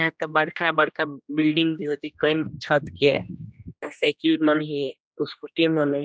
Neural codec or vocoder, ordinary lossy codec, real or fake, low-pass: codec, 16 kHz, 1 kbps, X-Codec, HuBERT features, trained on general audio; none; fake; none